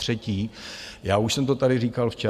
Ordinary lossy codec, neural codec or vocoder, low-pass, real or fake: AAC, 96 kbps; none; 14.4 kHz; real